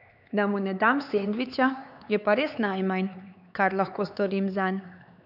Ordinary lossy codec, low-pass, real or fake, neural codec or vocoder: none; 5.4 kHz; fake; codec, 16 kHz, 4 kbps, X-Codec, HuBERT features, trained on LibriSpeech